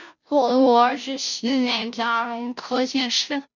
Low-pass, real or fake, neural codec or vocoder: 7.2 kHz; fake; codec, 16 kHz, 0.5 kbps, FunCodec, trained on Chinese and English, 25 frames a second